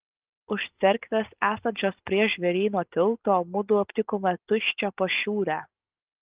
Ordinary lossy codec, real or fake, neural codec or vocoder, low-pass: Opus, 32 kbps; real; none; 3.6 kHz